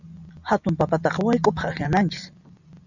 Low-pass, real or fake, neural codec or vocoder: 7.2 kHz; real; none